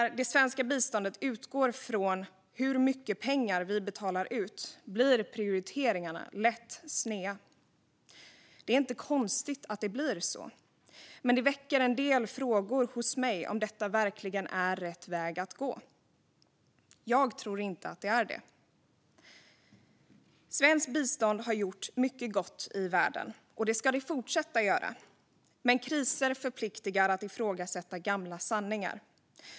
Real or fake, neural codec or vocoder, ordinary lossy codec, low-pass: real; none; none; none